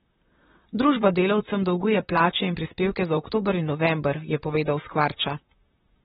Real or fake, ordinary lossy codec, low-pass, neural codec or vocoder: fake; AAC, 16 kbps; 19.8 kHz; vocoder, 44.1 kHz, 128 mel bands, Pupu-Vocoder